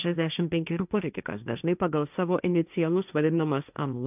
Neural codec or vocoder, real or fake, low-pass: codec, 16 kHz, 1.1 kbps, Voila-Tokenizer; fake; 3.6 kHz